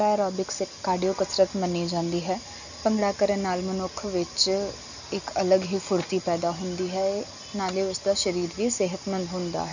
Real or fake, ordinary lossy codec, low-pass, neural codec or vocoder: real; none; 7.2 kHz; none